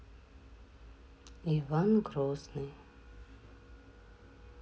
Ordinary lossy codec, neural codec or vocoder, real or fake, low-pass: none; none; real; none